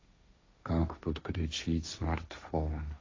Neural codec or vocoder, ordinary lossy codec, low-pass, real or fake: codec, 16 kHz, 1.1 kbps, Voila-Tokenizer; AAC, 32 kbps; 7.2 kHz; fake